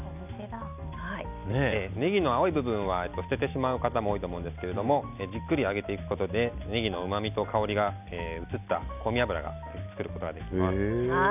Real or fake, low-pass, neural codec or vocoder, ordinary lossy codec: real; 3.6 kHz; none; none